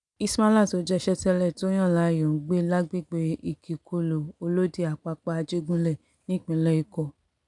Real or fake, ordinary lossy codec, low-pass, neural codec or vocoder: real; none; 10.8 kHz; none